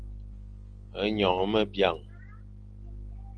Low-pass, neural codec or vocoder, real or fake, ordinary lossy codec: 9.9 kHz; none; real; Opus, 24 kbps